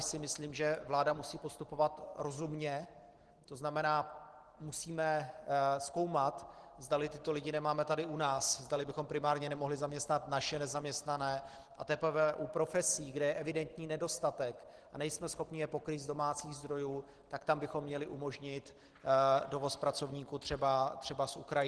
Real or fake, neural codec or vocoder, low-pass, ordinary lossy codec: real; none; 10.8 kHz; Opus, 16 kbps